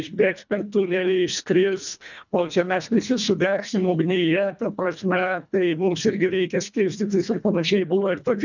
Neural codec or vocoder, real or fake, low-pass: codec, 24 kHz, 1.5 kbps, HILCodec; fake; 7.2 kHz